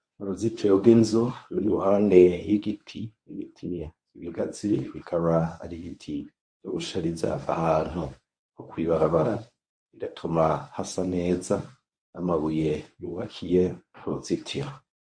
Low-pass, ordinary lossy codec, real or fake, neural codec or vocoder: 9.9 kHz; MP3, 48 kbps; fake; codec, 24 kHz, 0.9 kbps, WavTokenizer, medium speech release version 1